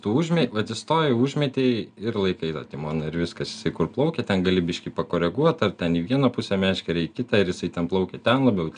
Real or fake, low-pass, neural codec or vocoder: real; 9.9 kHz; none